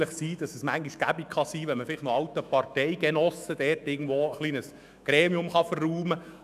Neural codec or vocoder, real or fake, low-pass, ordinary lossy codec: autoencoder, 48 kHz, 128 numbers a frame, DAC-VAE, trained on Japanese speech; fake; 14.4 kHz; none